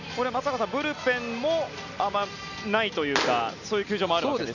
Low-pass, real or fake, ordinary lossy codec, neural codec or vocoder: 7.2 kHz; real; none; none